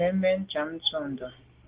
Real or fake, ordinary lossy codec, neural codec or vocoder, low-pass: real; Opus, 16 kbps; none; 3.6 kHz